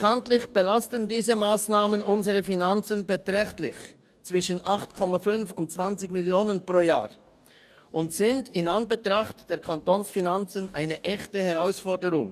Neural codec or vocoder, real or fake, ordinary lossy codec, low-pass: codec, 44.1 kHz, 2.6 kbps, DAC; fake; none; 14.4 kHz